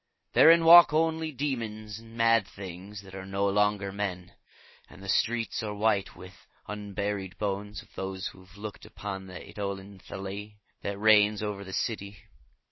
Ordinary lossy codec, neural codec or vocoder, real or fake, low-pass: MP3, 24 kbps; none; real; 7.2 kHz